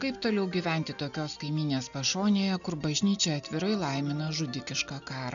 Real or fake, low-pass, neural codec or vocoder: real; 7.2 kHz; none